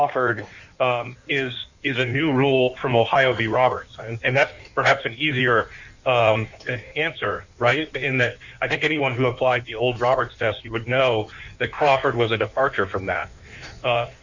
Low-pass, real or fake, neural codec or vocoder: 7.2 kHz; fake; codec, 16 kHz in and 24 kHz out, 1.1 kbps, FireRedTTS-2 codec